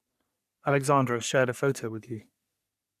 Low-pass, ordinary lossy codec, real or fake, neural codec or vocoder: 14.4 kHz; none; fake; codec, 44.1 kHz, 3.4 kbps, Pupu-Codec